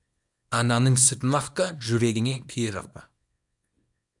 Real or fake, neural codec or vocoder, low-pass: fake; codec, 24 kHz, 0.9 kbps, WavTokenizer, small release; 10.8 kHz